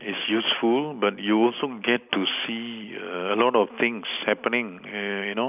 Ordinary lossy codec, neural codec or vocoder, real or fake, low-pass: none; none; real; 3.6 kHz